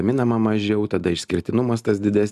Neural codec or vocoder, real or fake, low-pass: none; real; 14.4 kHz